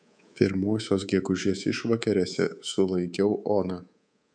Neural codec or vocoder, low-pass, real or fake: codec, 24 kHz, 3.1 kbps, DualCodec; 9.9 kHz; fake